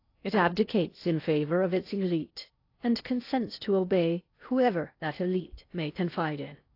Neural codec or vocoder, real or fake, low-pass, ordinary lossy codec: codec, 16 kHz in and 24 kHz out, 0.8 kbps, FocalCodec, streaming, 65536 codes; fake; 5.4 kHz; AAC, 32 kbps